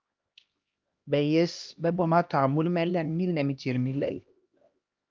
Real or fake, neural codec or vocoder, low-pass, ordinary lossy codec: fake; codec, 16 kHz, 1 kbps, X-Codec, HuBERT features, trained on LibriSpeech; 7.2 kHz; Opus, 24 kbps